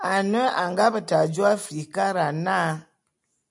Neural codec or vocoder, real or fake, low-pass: none; real; 10.8 kHz